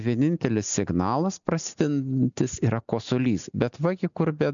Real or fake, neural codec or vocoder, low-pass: real; none; 7.2 kHz